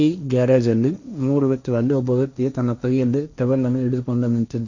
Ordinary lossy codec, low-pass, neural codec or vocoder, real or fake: none; 7.2 kHz; codec, 16 kHz, 1.1 kbps, Voila-Tokenizer; fake